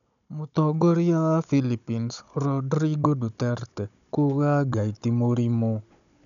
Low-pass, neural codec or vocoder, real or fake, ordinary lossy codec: 7.2 kHz; codec, 16 kHz, 16 kbps, FunCodec, trained on Chinese and English, 50 frames a second; fake; none